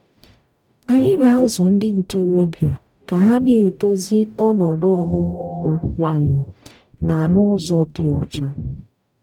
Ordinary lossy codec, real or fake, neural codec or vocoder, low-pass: none; fake; codec, 44.1 kHz, 0.9 kbps, DAC; 19.8 kHz